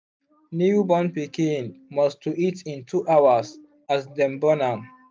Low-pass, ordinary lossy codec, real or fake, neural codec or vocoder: none; none; real; none